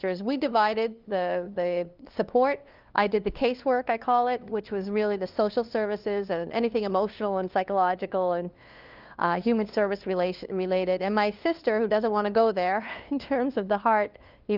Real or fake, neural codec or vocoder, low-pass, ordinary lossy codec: fake; codec, 16 kHz, 2 kbps, FunCodec, trained on LibriTTS, 25 frames a second; 5.4 kHz; Opus, 24 kbps